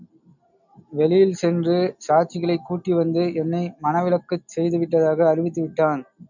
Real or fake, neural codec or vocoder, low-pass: real; none; 7.2 kHz